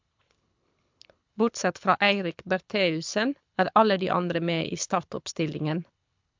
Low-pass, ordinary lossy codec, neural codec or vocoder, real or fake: 7.2 kHz; MP3, 64 kbps; codec, 24 kHz, 6 kbps, HILCodec; fake